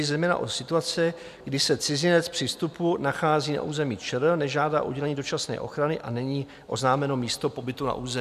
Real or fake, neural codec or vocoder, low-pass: real; none; 14.4 kHz